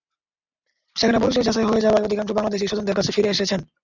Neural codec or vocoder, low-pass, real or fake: none; 7.2 kHz; real